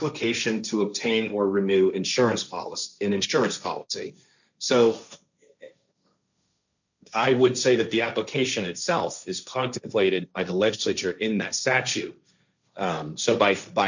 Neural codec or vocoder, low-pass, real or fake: codec, 16 kHz, 1.1 kbps, Voila-Tokenizer; 7.2 kHz; fake